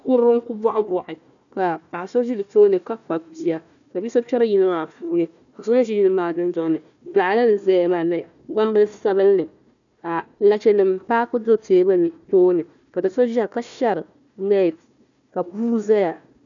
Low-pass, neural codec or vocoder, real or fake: 7.2 kHz; codec, 16 kHz, 1 kbps, FunCodec, trained on Chinese and English, 50 frames a second; fake